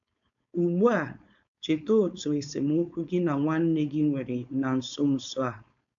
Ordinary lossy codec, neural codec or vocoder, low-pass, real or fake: AAC, 64 kbps; codec, 16 kHz, 4.8 kbps, FACodec; 7.2 kHz; fake